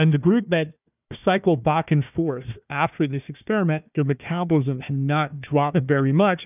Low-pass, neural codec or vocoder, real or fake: 3.6 kHz; codec, 16 kHz, 1 kbps, FunCodec, trained on Chinese and English, 50 frames a second; fake